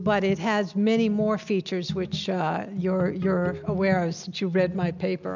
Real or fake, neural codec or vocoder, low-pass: real; none; 7.2 kHz